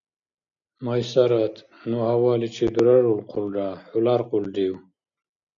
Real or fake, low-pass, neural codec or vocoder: real; 7.2 kHz; none